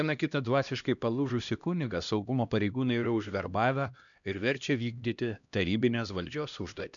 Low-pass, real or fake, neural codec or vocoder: 7.2 kHz; fake; codec, 16 kHz, 1 kbps, X-Codec, HuBERT features, trained on LibriSpeech